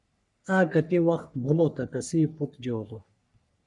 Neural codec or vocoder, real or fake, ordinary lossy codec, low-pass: codec, 44.1 kHz, 3.4 kbps, Pupu-Codec; fake; MP3, 96 kbps; 10.8 kHz